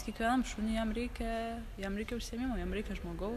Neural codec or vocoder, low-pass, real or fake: none; 14.4 kHz; real